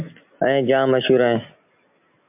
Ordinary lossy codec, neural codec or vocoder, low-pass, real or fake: MP3, 32 kbps; none; 3.6 kHz; real